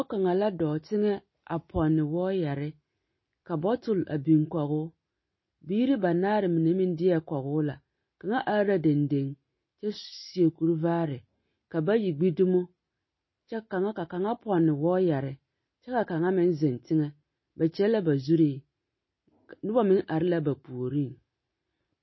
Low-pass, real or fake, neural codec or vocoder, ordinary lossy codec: 7.2 kHz; real; none; MP3, 24 kbps